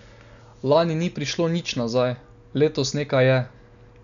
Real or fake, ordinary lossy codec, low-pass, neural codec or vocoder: real; none; 7.2 kHz; none